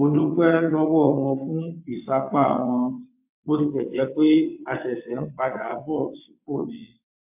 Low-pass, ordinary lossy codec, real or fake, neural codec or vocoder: 3.6 kHz; MP3, 32 kbps; fake; vocoder, 22.05 kHz, 80 mel bands, WaveNeXt